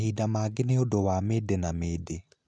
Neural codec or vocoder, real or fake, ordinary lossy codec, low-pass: none; real; none; 9.9 kHz